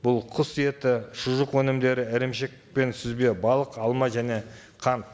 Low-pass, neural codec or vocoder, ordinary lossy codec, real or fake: none; none; none; real